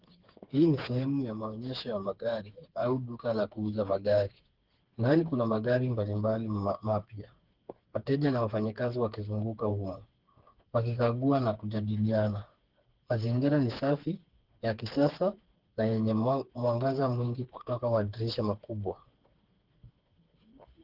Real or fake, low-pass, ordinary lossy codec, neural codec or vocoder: fake; 5.4 kHz; Opus, 16 kbps; codec, 16 kHz, 4 kbps, FreqCodec, smaller model